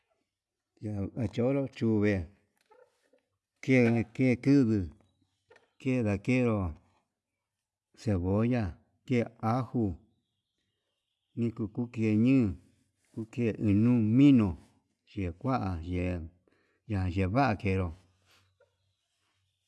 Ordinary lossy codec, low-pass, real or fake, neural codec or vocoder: none; none; real; none